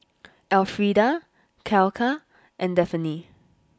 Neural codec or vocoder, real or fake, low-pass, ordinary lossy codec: none; real; none; none